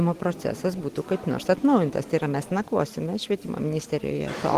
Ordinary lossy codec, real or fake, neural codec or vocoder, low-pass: Opus, 16 kbps; real; none; 14.4 kHz